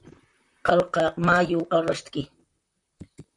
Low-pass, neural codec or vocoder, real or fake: 10.8 kHz; vocoder, 44.1 kHz, 128 mel bands, Pupu-Vocoder; fake